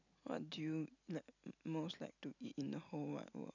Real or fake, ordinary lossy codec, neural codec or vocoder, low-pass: real; none; none; 7.2 kHz